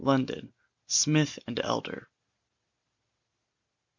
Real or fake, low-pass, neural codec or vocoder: real; 7.2 kHz; none